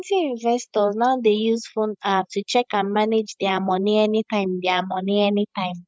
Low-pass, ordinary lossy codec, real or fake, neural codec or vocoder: none; none; fake; codec, 16 kHz, 16 kbps, FreqCodec, larger model